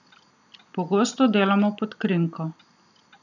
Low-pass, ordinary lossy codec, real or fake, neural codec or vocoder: 7.2 kHz; none; real; none